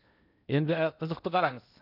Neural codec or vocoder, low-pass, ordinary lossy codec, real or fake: codec, 16 kHz in and 24 kHz out, 0.8 kbps, FocalCodec, streaming, 65536 codes; 5.4 kHz; none; fake